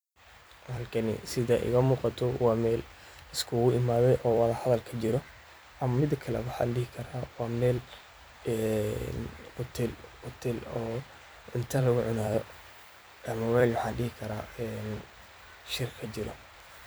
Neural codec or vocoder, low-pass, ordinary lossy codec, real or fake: vocoder, 44.1 kHz, 128 mel bands every 256 samples, BigVGAN v2; none; none; fake